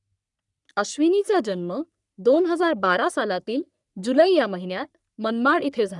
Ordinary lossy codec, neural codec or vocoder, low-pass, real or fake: none; codec, 44.1 kHz, 3.4 kbps, Pupu-Codec; 10.8 kHz; fake